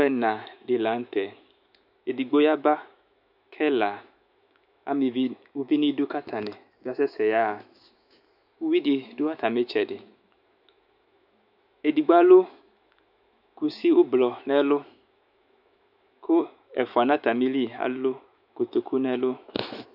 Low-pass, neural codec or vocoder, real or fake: 5.4 kHz; autoencoder, 48 kHz, 128 numbers a frame, DAC-VAE, trained on Japanese speech; fake